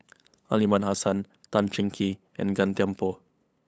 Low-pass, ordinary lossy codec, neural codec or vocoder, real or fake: none; none; codec, 16 kHz, 8 kbps, FunCodec, trained on LibriTTS, 25 frames a second; fake